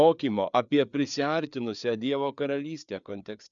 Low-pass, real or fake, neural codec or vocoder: 7.2 kHz; fake; codec, 16 kHz, 4 kbps, FreqCodec, larger model